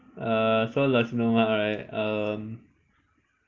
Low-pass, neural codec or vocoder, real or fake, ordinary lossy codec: 7.2 kHz; none; real; Opus, 24 kbps